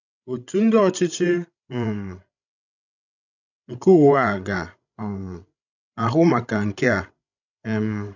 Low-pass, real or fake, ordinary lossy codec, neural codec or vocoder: 7.2 kHz; fake; none; codec, 16 kHz, 16 kbps, FreqCodec, larger model